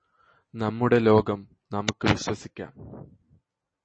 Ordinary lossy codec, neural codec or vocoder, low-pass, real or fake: MP3, 32 kbps; none; 9.9 kHz; real